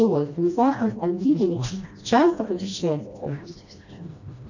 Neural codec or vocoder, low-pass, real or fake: codec, 16 kHz, 1 kbps, FreqCodec, smaller model; 7.2 kHz; fake